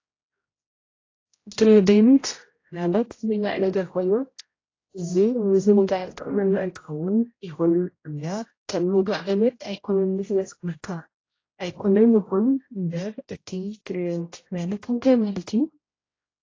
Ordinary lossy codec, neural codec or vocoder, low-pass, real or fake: AAC, 32 kbps; codec, 16 kHz, 0.5 kbps, X-Codec, HuBERT features, trained on general audio; 7.2 kHz; fake